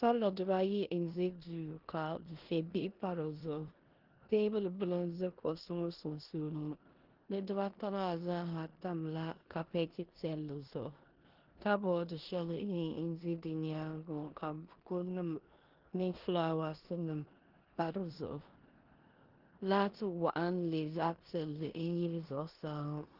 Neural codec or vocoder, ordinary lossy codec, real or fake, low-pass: codec, 16 kHz in and 24 kHz out, 0.9 kbps, LongCat-Audio-Codec, four codebook decoder; Opus, 16 kbps; fake; 5.4 kHz